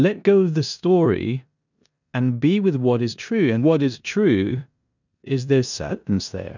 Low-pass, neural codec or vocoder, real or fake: 7.2 kHz; codec, 16 kHz in and 24 kHz out, 0.9 kbps, LongCat-Audio-Codec, four codebook decoder; fake